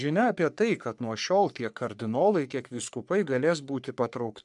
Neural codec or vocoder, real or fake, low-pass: codec, 44.1 kHz, 3.4 kbps, Pupu-Codec; fake; 10.8 kHz